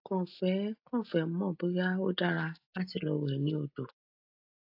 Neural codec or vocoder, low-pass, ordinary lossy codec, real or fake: none; 5.4 kHz; AAC, 32 kbps; real